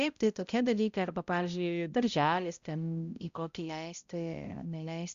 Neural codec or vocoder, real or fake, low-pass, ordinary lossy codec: codec, 16 kHz, 0.5 kbps, X-Codec, HuBERT features, trained on balanced general audio; fake; 7.2 kHz; Opus, 64 kbps